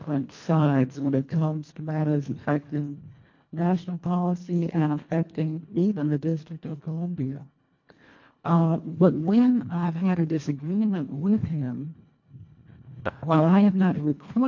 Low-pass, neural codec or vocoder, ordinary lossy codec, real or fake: 7.2 kHz; codec, 24 kHz, 1.5 kbps, HILCodec; MP3, 48 kbps; fake